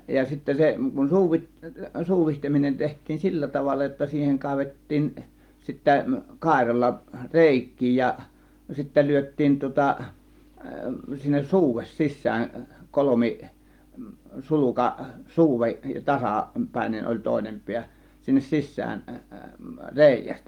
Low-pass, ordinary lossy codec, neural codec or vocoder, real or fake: 19.8 kHz; Opus, 24 kbps; none; real